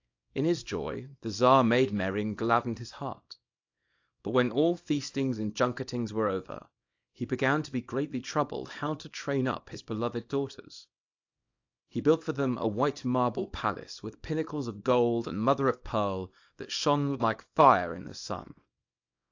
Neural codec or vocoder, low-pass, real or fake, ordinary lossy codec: codec, 24 kHz, 0.9 kbps, WavTokenizer, small release; 7.2 kHz; fake; AAC, 48 kbps